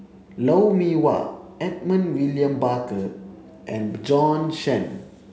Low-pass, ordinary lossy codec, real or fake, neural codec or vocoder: none; none; real; none